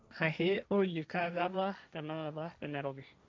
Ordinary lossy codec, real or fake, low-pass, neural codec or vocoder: none; fake; none; codec, 16 kHz, 1.1 kbps, Voila-Tokenizer